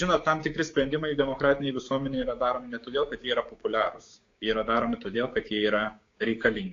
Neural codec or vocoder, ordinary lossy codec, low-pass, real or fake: codec, 16 kHz, 6 kbps, DAC; AAC, 48 kbps; 7.2 kHz; fake